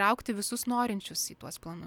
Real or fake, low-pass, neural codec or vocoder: real; 19.8 kHz; none